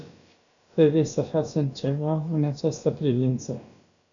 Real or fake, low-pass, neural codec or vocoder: fake; 7.2 kHz; codec, 16 kHz, about 1 kbps, DyCAST, with the encoder's durations